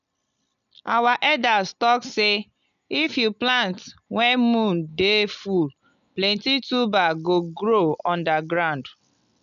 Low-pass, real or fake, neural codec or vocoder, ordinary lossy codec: 7.2 kHz; real; none; none